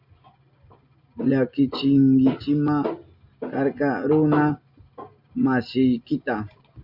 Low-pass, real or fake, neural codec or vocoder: 5.4 kHz; real; none